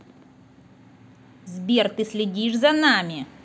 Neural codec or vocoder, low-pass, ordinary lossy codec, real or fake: none; none; none; real